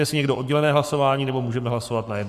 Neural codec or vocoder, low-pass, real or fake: codec, 44.1 kHz, 7.8 kbps, Pupu-Codec; 14.4 kHz; fake